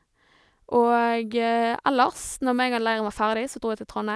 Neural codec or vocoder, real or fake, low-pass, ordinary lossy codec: none; real; none; none